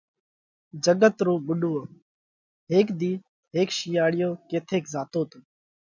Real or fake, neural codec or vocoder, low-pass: real; none; 7.2 kHz